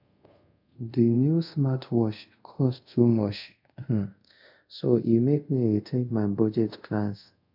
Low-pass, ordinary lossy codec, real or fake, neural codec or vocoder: 5.4 kHz; MP3, 48 kbps; fake; codec, 24 kHz, 0.5 kbps, DualCodec